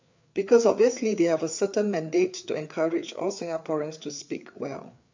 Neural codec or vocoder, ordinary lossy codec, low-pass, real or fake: codec, 16 kHz, 4 kbps, FreqCodec, larger model; MP3, 64 kbps; 7.2 kHz; fake